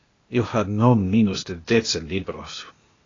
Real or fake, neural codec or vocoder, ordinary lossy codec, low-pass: fake; codec, 16 kHz, 0.8 kbps, ZipCodec; AAC, 32 kbps; 7.2 kHz